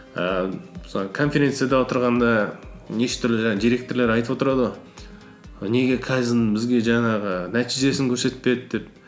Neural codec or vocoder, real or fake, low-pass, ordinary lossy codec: none; real; none; none